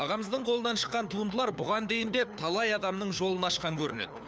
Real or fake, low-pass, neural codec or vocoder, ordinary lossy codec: fake; none; codec, 16 kHz, 4 kbps, FunCodec, trained on LibriTTS, 50 frames a second; none